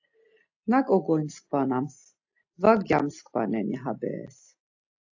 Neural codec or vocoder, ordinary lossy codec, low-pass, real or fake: none; AAC, 48 kbps; 7.2 kHz; real